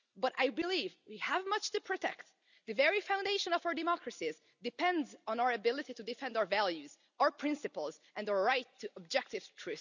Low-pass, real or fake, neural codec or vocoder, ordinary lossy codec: 7.2 kHz; real; none; none